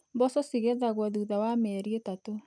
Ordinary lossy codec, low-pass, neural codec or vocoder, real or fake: none; 9.9 kHz; none; real